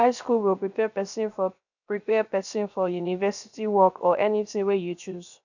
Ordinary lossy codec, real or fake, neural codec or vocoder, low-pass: none; fake; codec, 16 kHz, 0.7 kbps, FocalCodec; 7.2 kHz